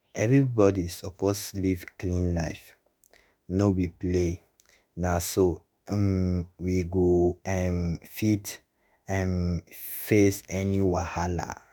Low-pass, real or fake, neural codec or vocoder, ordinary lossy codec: none; fake; autoencoder, 48 kHz, 32 numbers a frame, DAC-VAE, trained on Japanese speech; none